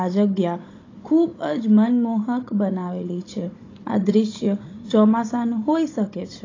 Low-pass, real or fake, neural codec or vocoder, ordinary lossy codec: 7.2 kHz; fake; codec, 16 kHz, 16 kbps, FunCodec, trained on Chinese and English, 50 frames a second; AAC, 32 kbps